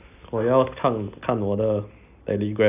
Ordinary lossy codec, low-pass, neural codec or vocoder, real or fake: none; 3.6 kHz; none; real